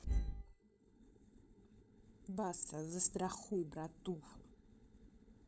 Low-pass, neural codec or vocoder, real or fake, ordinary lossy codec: none; codec, 16 kHz, 16 kbps, FunCodec, trained on LibriTTS, 50 frames a second; fake; none